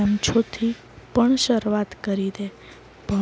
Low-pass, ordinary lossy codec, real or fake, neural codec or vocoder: none; none; real; none